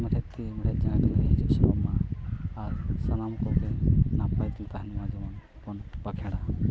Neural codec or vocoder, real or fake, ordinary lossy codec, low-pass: none; real; none; none